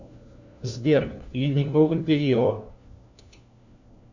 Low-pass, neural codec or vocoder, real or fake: 7.2 kHz; codec, 16 kHz, 1 kbps, FunCodec, trained on LibriTTS, 50 frames a second; fake